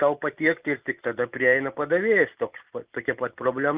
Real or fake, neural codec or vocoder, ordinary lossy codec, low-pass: real; none; Opus, 24 kbps; 3.6 kHz